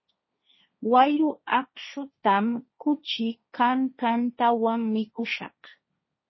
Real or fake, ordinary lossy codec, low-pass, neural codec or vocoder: fake; MP3, 24 kbps; 7.2 kHz; codec, 16 kHz, 1.1 kbps, Voila-Tokenizer